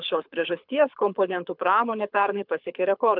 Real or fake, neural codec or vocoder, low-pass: fake; codec, 24 kHz, 6 kbps, HILCodec; 5.4 kHz